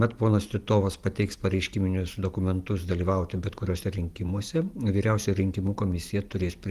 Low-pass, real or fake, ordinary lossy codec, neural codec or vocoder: 14.4 kHz; fake; Opus, 24 kbps; vocoder, 44.1 kHz, 128 mel bands every 512 samples, BigVGAN v2